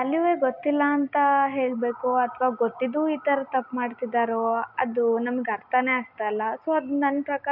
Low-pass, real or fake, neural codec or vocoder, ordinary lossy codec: 5.4 kHz; real; none; none